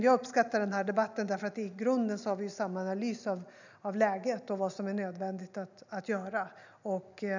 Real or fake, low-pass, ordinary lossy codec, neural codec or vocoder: real; 7.2 kHz; none; none